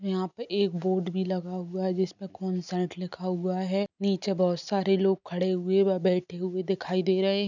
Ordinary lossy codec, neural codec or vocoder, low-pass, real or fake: none; none; 7.2 kHz; real